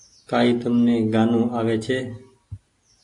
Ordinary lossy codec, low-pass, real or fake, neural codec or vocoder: AAC, 48 kbps; 10.8 kHz; real; none